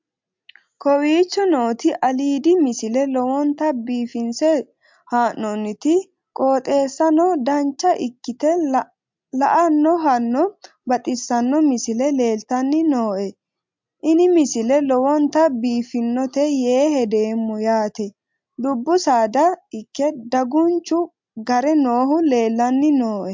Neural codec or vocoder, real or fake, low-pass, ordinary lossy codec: none; real; 7.2 kHz; MP3, 64 kbps